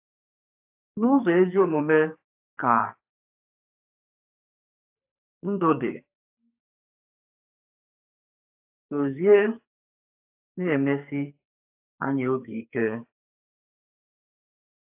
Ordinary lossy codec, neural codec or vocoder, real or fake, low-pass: AAC, 32 kbps; codec, 44.1 kHz, 2.6 kbps, SNAC; fake; 3.6 kHz